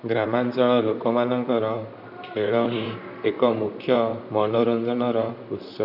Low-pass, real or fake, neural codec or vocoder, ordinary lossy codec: 5.4 kHz; fake; vocoder, 44.1 kHz, 128 mel bands, Pupu-Vocoder; none